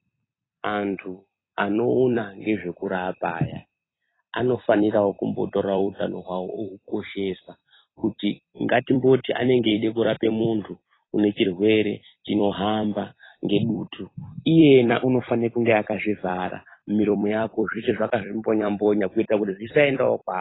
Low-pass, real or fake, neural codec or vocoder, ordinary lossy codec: 7.2 kHz; fake; vocoder, 44.1 kHz, 128 mel bands every 256 samples, BigVGAN v2; AAC, 16 kbps